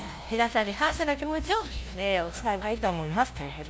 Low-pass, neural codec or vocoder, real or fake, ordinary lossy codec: none; codec, 16 kHz, 0.5 kbps, FunCodec, trained on LibriTTS, 25 frames a second; fake; none